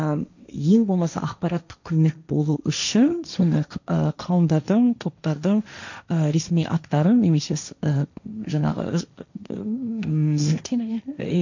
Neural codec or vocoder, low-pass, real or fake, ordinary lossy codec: codec, 16 kHz, 1.1 kbps, Voila-Tokenizer; 7.2 kHz; fake; none